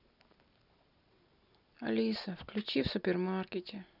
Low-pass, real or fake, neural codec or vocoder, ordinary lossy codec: 5.4 kHz; real; none; none